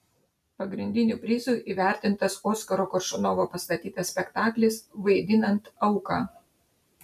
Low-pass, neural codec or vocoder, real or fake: 14.4 kHz; none; real